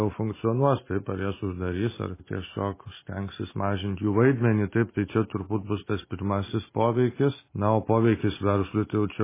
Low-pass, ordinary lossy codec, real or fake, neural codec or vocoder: 3.6 kHz; MP3, 16 kbps; real; none